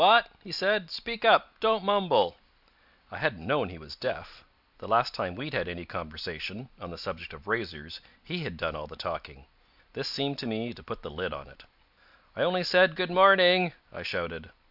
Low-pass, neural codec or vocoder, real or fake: 5.4 kHz; none; real